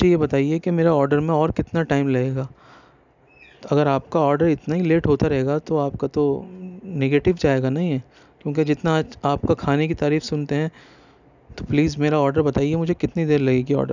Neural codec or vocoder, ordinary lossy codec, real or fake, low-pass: none; none; real; 7.2 kHz